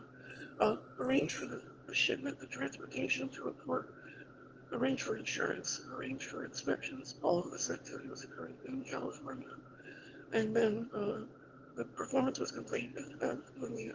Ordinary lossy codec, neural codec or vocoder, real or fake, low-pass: Opus, 16 kbps; autoencoder, 22.05 kHz, a latent of 192 numbers a frame, VITS, trained on one speaker; fake; 7.2 kHz